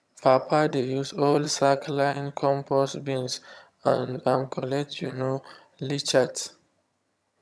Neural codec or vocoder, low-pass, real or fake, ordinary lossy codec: vocoder, 22.05 kHz, 80 mel bands, HiFi-GAN; none; fake; none